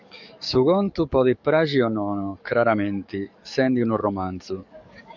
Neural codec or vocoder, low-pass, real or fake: codec, 44.1 kHz, 7.8 kbps, DAC; 7.2 kHz; fake